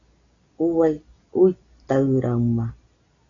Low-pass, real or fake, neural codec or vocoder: 7.2 kHz; real; none